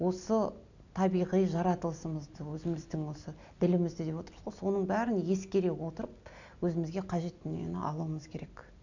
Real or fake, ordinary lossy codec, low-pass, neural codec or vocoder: real; none; 7.2 kHz; none